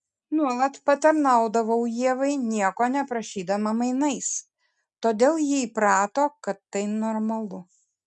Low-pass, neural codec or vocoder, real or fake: 10.8 kHz; none; real